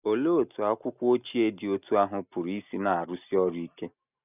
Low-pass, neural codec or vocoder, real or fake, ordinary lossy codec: 3.6 kHz; none; real; none